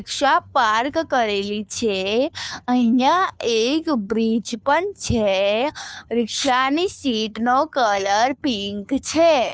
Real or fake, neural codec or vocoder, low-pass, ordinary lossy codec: fake; codec, 16 kHz, 2 kbps, FunCodec, trained on Chinese and English, 25 frames a second; none; none